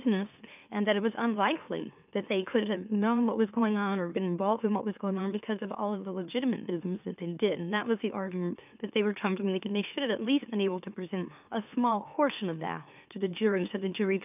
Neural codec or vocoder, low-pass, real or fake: autoencoder, 44.1 kHz, a latent of 192 numbers a frame, MeloTTS; 3.6 kHz; fake